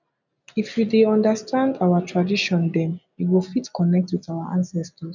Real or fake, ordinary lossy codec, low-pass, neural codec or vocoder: real; none; 7.2 kHz; none